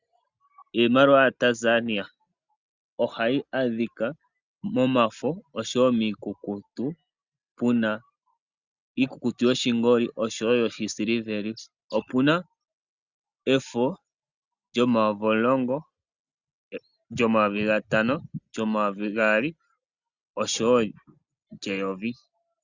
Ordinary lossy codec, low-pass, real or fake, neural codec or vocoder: Opus, 64 kbps; 7.2 kHz; real; none